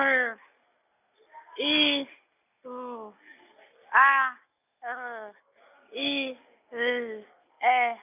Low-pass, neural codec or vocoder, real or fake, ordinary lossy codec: 3.6 kHz; none; real; none